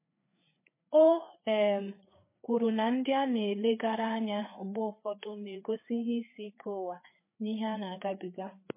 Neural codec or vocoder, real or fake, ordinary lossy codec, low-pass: codec, 16 kHz, 4 kbps, FreqCodec, larger model; fake; MP3, 24 kbps; 3.6 kHz